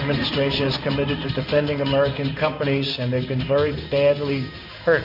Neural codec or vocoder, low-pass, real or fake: codec, 16 kHz in and 24 kHz out, 1 kbps, XY-Tokenizer; 5.4 kHz; fake